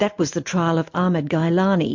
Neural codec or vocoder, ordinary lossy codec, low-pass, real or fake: none; MP3, 48 kbps; 7.2 kHz; real